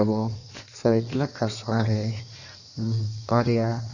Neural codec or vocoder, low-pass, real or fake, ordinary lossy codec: codec, 16 kHz in and 24 kHz out, 1.1 kbps, FireRedTTS-2 codec; 7.2 kHz; fake; none